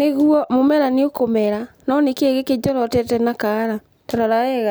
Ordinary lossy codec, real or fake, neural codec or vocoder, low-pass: none; real; none; none